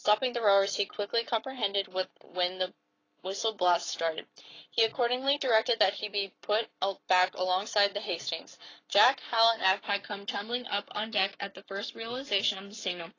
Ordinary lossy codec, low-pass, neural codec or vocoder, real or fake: AAC, 32 kbps; 7.2 kHz; codec, 44.1 kHz, 7.8 kbps, Pupu-Codec; fake